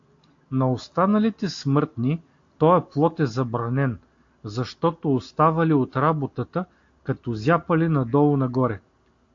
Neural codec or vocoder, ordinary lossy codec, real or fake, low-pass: none; AAC, 48 kbps; real; 7.2 kHz